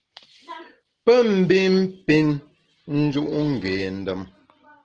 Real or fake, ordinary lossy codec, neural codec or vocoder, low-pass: real; Opus, 16 kbps; none; 9.9 kHz